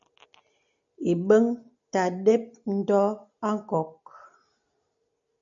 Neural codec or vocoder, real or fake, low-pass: none; real; 7.2 kHz